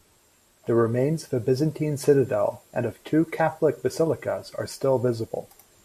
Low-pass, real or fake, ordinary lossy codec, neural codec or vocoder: 14.4 kHz; real; MP3, 64 kbps; none